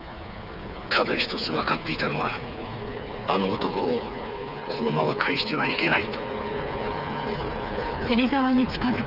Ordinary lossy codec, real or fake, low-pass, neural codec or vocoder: none; fake; 5.4 kHz; codec, 16 kHz, 4 kbps, FreqCodec, smaller model